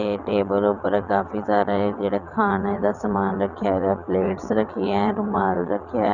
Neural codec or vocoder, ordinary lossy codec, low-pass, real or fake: vocoder, 22.05 kHz, 80 mel bands, WaveNeXt; none; 7.2 kHz; fake